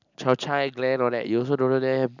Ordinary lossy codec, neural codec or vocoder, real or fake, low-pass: none; none; real; 7.2 kHz